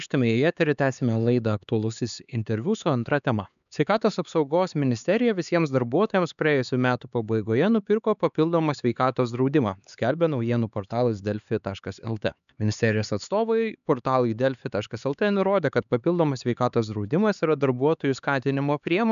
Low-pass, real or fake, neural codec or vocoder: 7.2 kHz; fake; codec, 16 kHz, 4 kbps, X-Codec, HuBERT features, trained on LibriSpeech